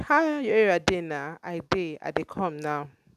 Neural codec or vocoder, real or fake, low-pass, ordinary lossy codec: none; real; 14.4 kHz; none